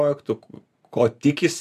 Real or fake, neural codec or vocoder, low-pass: real; none; 14.4 kHz